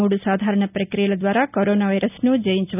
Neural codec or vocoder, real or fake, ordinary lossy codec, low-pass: none; real; none; 3.6 kHz